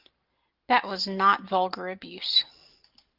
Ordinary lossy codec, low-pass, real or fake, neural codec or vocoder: Opus, 32 kbps; 5.4 kHz; real; none